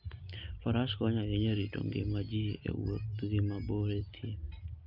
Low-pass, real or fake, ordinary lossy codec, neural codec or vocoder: 5.4 kHz; real; Opus, 24 kbps; none